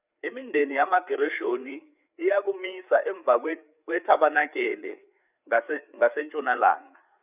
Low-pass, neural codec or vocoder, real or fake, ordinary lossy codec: 3.6 kHz; codec, 16 kHz, 4 kbps, FreqCodec, larger model; fake; none